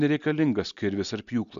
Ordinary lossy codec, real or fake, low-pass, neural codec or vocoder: AAC, 96 kbps; real; 7.2 kHz; none